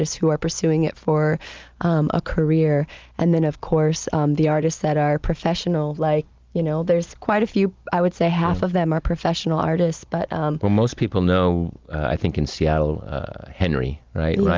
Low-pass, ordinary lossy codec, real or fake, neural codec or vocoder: 7.2 kHz; Opus, 32 kbps; real; none